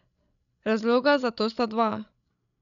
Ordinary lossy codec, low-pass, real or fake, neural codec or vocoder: none; 7.2 kHz; fake; codec, 16 kHz, 16 kbps, FreqCodec, larger model